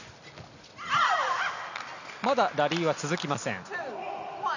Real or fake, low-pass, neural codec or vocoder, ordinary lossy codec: real; 7.2 kHz; none; none